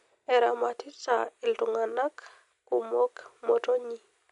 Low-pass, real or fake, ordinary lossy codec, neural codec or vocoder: 10.8 kHz; real; none; none